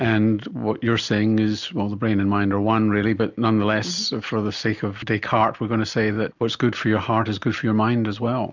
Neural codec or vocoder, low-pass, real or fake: none; 7.2 kHz; real